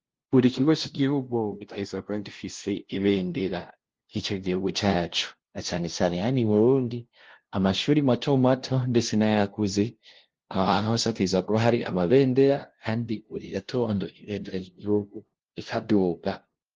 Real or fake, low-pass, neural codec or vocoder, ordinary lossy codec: fake; 7.2 kHz; codec, 16 kHz, 0.5 kbps, FunCodec, trained on LibriTTS, 25 frames a second; Opus, 16 kbps